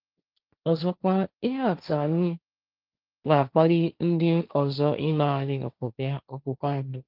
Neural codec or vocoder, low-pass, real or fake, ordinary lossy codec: codec, 16 kHz, 1.1 kbps, Voila-Tokenizer; 5.4 kHz; fake; Opus, 32 kbps